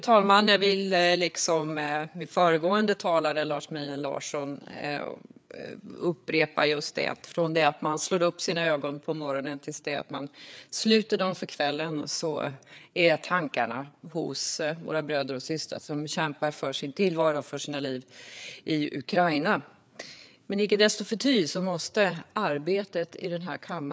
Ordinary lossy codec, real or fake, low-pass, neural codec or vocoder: none; fake; none; codec, 16 kHz, 4 kbps, FreqCodec, larger model